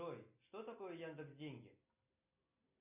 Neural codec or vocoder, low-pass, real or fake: none; 3.6 kHz; real